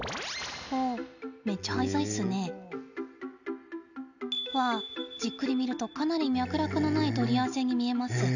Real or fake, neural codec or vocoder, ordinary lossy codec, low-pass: real; none; none; 7.2 kHz